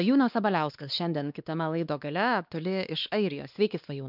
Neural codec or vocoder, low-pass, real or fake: codec, 16 kHz, 2 kbps, X-Codec, WavLM features, trained on Multilingual LibriSpeech; 5.4 kHz; fake